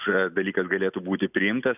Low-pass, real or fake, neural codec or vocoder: 3.6 kHz; real; none